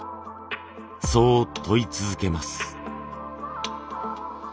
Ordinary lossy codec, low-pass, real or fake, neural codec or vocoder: none; none; real; none